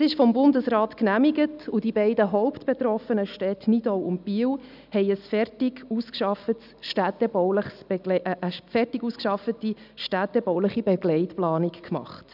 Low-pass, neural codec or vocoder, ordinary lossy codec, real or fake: 5.4 kHz; none; none; real